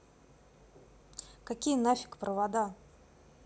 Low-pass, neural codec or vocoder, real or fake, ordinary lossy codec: none; none; real; none